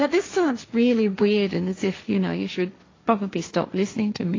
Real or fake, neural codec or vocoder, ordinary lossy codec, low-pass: fake; codec, 16 kHz, 1.1 kbps, Voila-Tokenizer; AAC, 32 kbps; 7.2 kHz